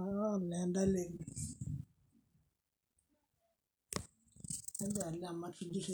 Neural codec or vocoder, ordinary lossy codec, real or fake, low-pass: none; none; real; none